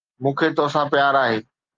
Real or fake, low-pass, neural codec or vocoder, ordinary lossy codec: real; 7.2 kHz; none; Opus, 32 kbps